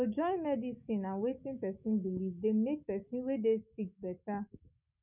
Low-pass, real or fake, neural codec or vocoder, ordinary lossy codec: 3.6 kHz; fake; autoencoder, 48 kHz, 128 numbers a frame, DAC-VAE, trained on Japanese speech; none